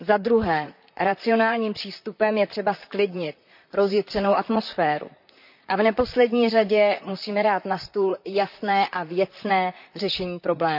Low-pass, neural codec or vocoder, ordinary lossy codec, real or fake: 5.4 kHz; vocoder, 44.1 kHz, 128 mel bands, Pupu-Vocoder; none; fake